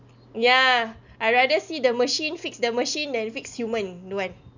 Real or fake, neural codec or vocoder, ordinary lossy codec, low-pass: real; none; none; 7.2 kHz